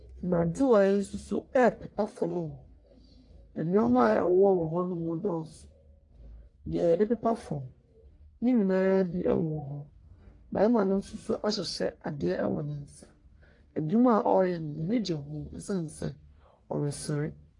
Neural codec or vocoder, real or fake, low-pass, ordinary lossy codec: codec, 44.1 kHz, 1.7 kbps, Pupu-Codec; fake; 10.8 kHz; AAC, 48 kbps